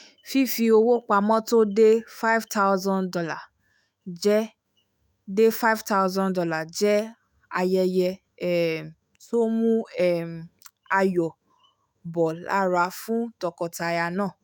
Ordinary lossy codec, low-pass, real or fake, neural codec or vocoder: none; 19.8 kHz; fake; autoencoder, 48 kHz, 128 numbers a frame, DAC-VAE, trained on Japanese speech